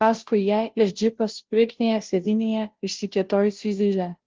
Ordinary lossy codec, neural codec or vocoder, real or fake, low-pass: Opus, 16 kbps; codec, 16 kHz, 0.5 kbps, FunCodec, trained on Chinese and English, 25 frames a second; fake; 7.2 kHz